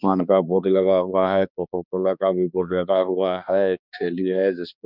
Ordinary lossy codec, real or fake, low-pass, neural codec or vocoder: none; fake; 5.4 kHz; codec, 16 kHz, 2 kbps, X-Codec, HuBERT features, trained on balanced general audio